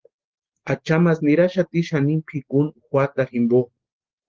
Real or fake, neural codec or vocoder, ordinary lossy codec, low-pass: real; none; Opus, 16 kbps; 7.2 kHz